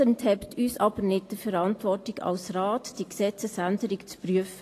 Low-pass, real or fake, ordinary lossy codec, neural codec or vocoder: 14.4 kHz; real; AAC, 48 kbps; none